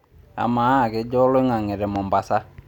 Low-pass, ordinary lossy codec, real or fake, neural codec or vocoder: 19.8 kHz; none; real; none